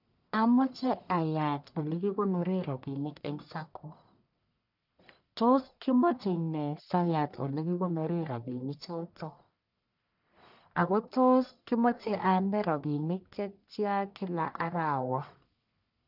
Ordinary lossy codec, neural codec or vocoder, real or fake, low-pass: none; codec, 44.1 kHz, 1.7 kbps, Pupu-Codec; fake; 5.4 kHz